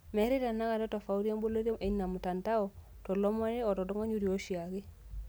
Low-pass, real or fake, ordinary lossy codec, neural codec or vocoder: none; real; none; none